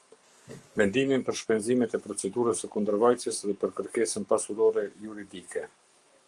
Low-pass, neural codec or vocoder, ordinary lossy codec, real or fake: 10.8 kHz; codec, 44.1 kHz, 7.8 kbps, Pupu-Codec; Opus, 32 kbps; fake